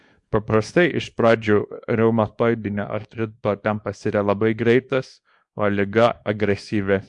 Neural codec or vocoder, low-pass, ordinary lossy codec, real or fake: codec, 24 kHz, 0.9 kbps, WavTokenizer, small release; 10.8 kHz; MP3, 64 kbps; fake